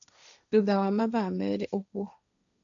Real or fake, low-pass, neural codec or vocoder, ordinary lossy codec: fake; 7.2 kHz; codec, 16 kHz, 1.1 kbps, Voila-Tokenizer; none